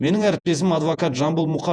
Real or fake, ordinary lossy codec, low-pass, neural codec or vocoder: fake; none; 9.9 kHz; vocoder, 48 kHz, 128 mel bands, Vocos